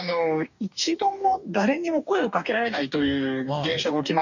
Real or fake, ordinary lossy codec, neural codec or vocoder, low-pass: fake; none; codec, 44.1 kHz, 2.6 kbps, DAC; 7.2 kHz